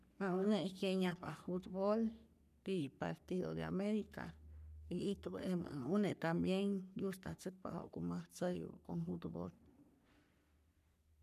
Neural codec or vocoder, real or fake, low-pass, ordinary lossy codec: codec, 44.1 kHz, 3.4 kbps, Pupu-Codec; fake; 14.4 kHz; none